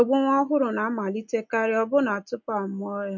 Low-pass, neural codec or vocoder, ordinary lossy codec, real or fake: 7.2 kHz; none; MP3, 48 kbps; real